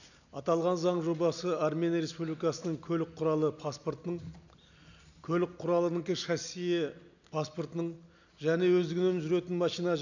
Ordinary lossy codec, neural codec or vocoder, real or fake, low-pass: none; none; real; 7.2 kHz